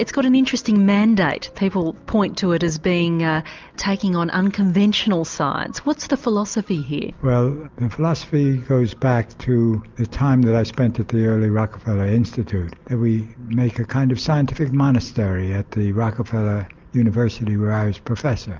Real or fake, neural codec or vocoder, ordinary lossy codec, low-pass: real; none; Opus, 24 kbps; 7.2 kHz